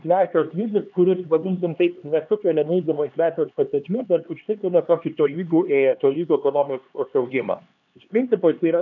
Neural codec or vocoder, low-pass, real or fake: codec, 16 kHz, 2 kbps, X-Codec, HuBERT features, trained on LibriSpeech; 7.2 kHz; fake